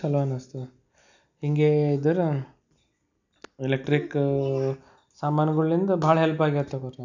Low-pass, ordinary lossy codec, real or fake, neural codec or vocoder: 7.2 kHz; none; real; none